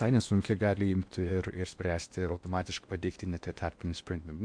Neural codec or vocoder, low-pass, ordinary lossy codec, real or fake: codec, 16 kHz in and 24 kHz out, 0.8 kbps, FocalCodec, streaming, 65536 codes; 9.9 kHz; MP3, 64 kbps; fake